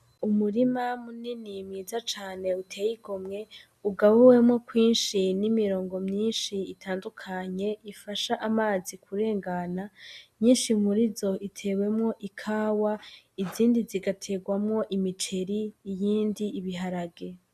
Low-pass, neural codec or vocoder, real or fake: 14.4 kHz; none; real